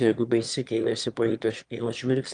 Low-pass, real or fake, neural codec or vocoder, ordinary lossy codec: 9.9 kHz; fake; autoencoder, 22.05 kHz, a latent of 192 numbers a frame, VITS, trained on one speaker; Opus, 32 kbps